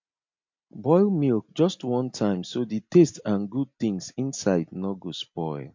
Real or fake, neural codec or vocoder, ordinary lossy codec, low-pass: real; none; MP3, 48 kbps; 7.2 kHz